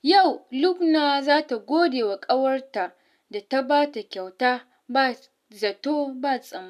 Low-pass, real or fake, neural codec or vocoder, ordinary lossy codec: 14.4 kHz; real; none; none